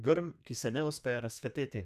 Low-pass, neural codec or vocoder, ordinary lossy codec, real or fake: 14.4 kHz; codec, 32 kHz, 1.9 kbps, SNAC; none; fake